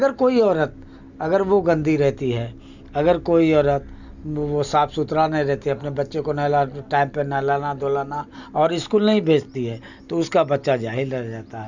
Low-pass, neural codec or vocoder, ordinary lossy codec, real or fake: 7.2 kHz; none; Opus, 64 kbps; real